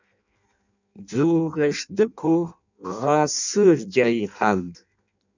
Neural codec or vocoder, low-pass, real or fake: codec, 16 kHz in and 24 kHz out, 0.6 kbps, FireRedTTS-2 codec; 7.2 kHz; fake